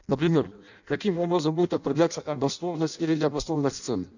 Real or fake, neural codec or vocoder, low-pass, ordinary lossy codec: fake; codec, 16 kHz in and 24 kHz out, 0.6 kbps, FireRedTTS-2 codec; 7.2 kHz; none